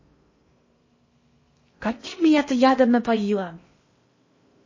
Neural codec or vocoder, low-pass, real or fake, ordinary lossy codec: codec, 16 kHz in and 24 kHz out, 0.6 kbps, FocalCodec, streaming, 4096 codes; 7.2 kHz; fake; MP3, 32 kbps